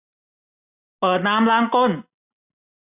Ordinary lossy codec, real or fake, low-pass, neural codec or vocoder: none; real; 3.6 kHz; none